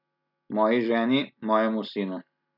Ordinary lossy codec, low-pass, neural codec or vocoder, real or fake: none; 5.4 kHz; none; real